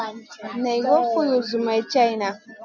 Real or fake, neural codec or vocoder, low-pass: real; none; 7.2 kHz